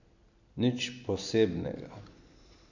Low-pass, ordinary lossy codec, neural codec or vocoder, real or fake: 7.2 kHz; none; none; real